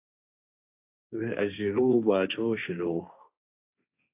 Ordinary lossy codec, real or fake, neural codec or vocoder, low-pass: AAC, 32 kbps; fake; codec, 16 kHz, 1.1 kbps, Voila-Tokenizer; 3.6 kHz